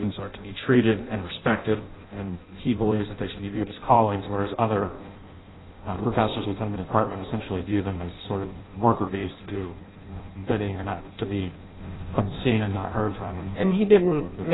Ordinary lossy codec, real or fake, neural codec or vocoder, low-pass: AAC, 16 kbps; fake; codec, 16 kHz in and 24 kHz out, 0.6 kbps, FireRedTTS-2 codec; 7.2 kHz